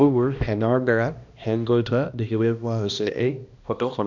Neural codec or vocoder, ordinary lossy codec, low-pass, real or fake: codec, 16 kHz, 1 kbps, X-Codec, HuBERT features, trained on balanced general audio; none; 7.2 kHz; fake